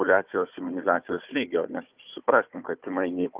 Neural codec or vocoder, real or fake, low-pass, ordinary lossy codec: codec, 16 kHz, 4 kbps, FunCodec, trained on Chinese and English, 50 frames a second; fake; 3.6 kHz; Opus, 24 kbps